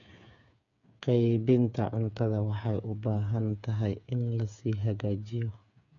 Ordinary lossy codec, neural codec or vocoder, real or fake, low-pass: none; codec, 16 kHz, 8 kbps, FreqCodec, smaller model; fake; 7.2 kHz